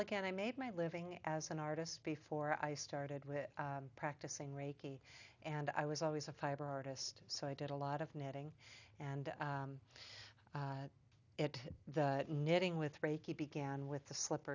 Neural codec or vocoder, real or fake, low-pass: none; real; 7.2 kHz